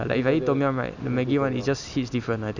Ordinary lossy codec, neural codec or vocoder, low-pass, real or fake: none; none; 7.2 kHz; real